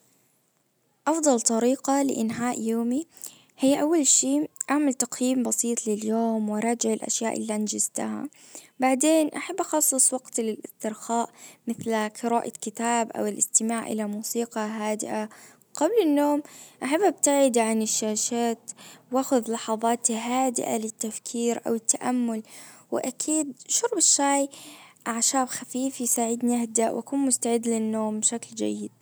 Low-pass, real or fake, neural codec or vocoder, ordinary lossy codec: none; real; none; none